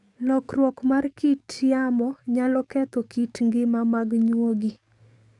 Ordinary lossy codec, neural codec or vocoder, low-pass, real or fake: none; codec, 44.1 kHz, 7.8 kbps, DAC; 10.8 kHz; fake